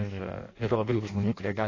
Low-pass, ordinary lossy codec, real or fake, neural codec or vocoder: 7.2 kHz; AAC, 32 kbps; fake; codec, 16 kHz in and 24 kHz out, 0.6 kbps, FireRedTTS-2 codec